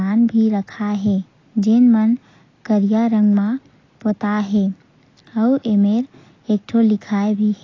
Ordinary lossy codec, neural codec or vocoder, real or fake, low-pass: AAC, 32 kbps; none; real; 7.2 kHz